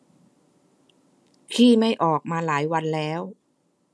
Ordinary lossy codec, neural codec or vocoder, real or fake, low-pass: none; none; real; none